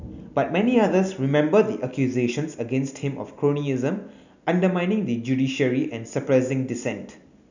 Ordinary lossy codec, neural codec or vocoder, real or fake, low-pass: none; none; real; 7.2 kHz